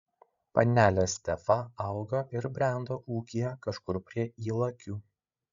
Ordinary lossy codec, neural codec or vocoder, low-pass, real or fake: Opus, 64 kbps; codec, 16 kHz, 16 kbps, FreqCodec, larger model; 7.2 kHz; fake